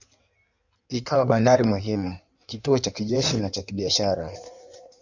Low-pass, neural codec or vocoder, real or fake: 7.2 kHz; codec, 16 kHz in and 24 kHz out, 1.1 kbps, FireRedTTS-2 codec; fake